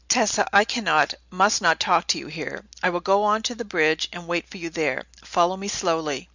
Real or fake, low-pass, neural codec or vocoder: real; 7.2 kHz; none